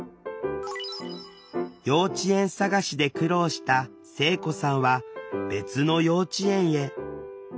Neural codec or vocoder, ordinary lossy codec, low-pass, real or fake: none; none; none; real